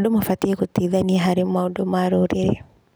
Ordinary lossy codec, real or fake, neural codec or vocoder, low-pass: none; real; none; none